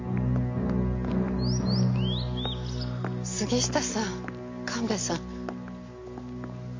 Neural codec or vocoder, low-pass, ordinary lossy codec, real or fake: none; 7.2 kHz; MP3, 64 kbps; real